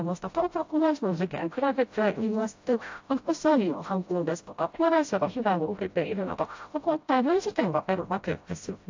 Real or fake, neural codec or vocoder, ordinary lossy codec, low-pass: fake; codec, 16 kHz, 0.5 kbps, FreqCodec, smaller model; AAC, 48 kbps; 7.2 kHz